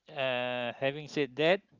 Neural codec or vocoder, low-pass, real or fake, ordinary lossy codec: none; 7.2 kHz; real; Opus, 24 kbps